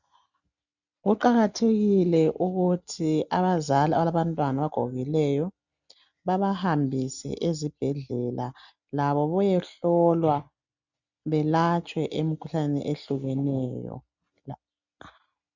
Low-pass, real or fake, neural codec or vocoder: 7.2 kHz; real; none